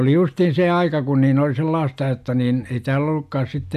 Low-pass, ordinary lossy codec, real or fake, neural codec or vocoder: 14.4 kHz; MP3, 96 kbps; real; none